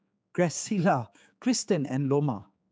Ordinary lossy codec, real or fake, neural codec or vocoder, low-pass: none; fake; codec, 16 kHz, 4 kbps, X-Codec, HuBERT features, trained on general audio; none